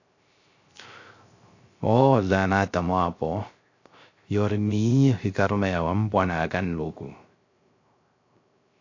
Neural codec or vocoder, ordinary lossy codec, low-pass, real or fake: codec, 16 kHz, 0.3 kbps, FocalCodec; AAC, 48 kbps; 7.2 kHz; fake